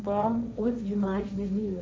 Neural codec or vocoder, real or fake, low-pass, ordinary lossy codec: codec, 16 kHz, 1.1 kbps, Voila-Tokenizer; fake; none; none